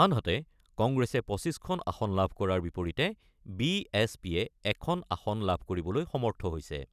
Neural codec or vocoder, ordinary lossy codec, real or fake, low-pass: none; none; real; 14.4 kHz